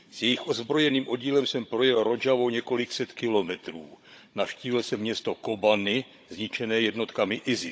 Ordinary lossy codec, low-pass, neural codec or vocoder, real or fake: none; none; codec, 16 kHz, 16 kbps, FunCodec, trained on Chinese and English, 50 frames a second; fake